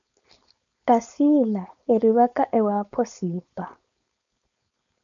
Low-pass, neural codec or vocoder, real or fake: 7.2 kHz; codec, 16 kHz, 4.8 kbps, FACodec; fake